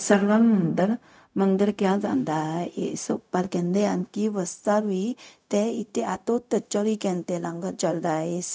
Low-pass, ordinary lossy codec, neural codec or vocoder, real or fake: none; none; codec, 16 kHz, 0.4 kbps, LongCat-Audio-Codec; fake